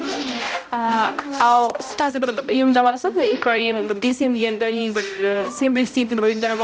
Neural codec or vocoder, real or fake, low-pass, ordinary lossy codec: codec, 16 kHz, 0.5 kbps, X-Codec, HuBERT features, trained on balanced general audio; fake; none; none